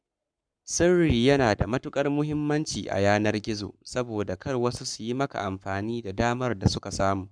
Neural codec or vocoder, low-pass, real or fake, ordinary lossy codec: codec, 44.1 kHz, 7.8 kbps, Pupu-Codec; 9.9 kHz; fake; none